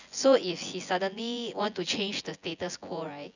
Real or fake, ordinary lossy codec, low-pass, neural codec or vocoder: fake; none; 7.2 kHz; vocoder, 24 kHz, 100 mel bands, Vocos